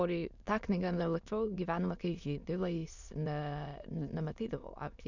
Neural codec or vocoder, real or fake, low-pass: autoencoder, 22.05 kHz, a latent of 192 numbers a frame, VITS, trained on many speakers; fake; 7.2 kHz